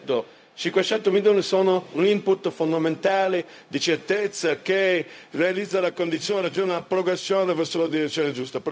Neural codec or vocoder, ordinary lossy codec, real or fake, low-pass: codec, 16 kHz, 0.4 kbps, LongCat-Audio-Codec; none; fake; none